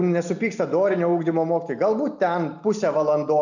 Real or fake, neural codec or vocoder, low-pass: real; none; 7.2 kHz